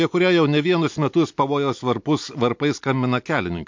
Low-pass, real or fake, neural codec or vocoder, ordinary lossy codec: 7.2 kHz; real; none; MP3, 48 kbps